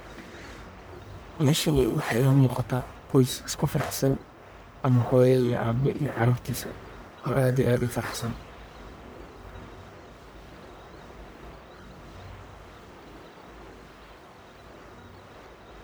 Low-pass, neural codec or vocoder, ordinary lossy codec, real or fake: none; codec, 44.1 kHz, 1.7 kbps, Pupu-Codec; none; fake